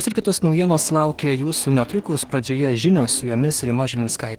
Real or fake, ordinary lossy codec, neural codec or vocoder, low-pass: fake; Opus, 24 kbps; codec, 44.1 kHz, 2.6 kbps, DAC; 19.8 kHz